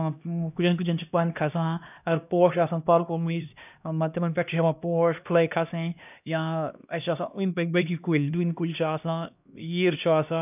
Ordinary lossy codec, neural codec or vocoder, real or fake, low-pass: none; codec, 16 kHz, 1 kbps, X-Codec, HuBERT features, trained on LibriSpeech; fake; 3.6 kHz